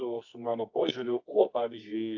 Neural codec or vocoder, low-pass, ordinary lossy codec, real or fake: codec, 24 kHz, 0.9 kbps, WavTokenizer, medium music audio release; 7.2 kHz; AAC, 48 kbps; fake